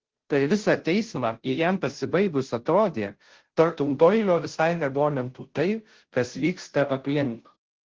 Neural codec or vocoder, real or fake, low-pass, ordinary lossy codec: codec, 16 kHz, 0.5 kbps, FunCodec, trained on Chinese and English, 25 frames a second; fake; 7.2 kHz; Opus, 16 kbps